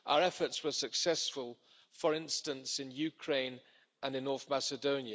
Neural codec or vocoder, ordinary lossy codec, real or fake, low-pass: none; none; real; none